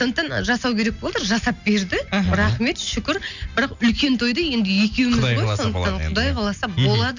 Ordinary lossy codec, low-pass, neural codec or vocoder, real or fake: none; 7.2 kHz; none; real